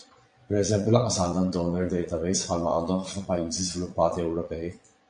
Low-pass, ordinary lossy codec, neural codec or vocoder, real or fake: 9.9 kHz; MP3, 48 kbps; vocoder, 22.05 kHz, 80 mel bands, Vocos; fake